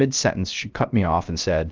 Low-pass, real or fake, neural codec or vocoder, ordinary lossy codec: 7.2 kHz; fake; codec, 16 kHz, 0.3 kbps, FocalCodec; Opus, 32 kbps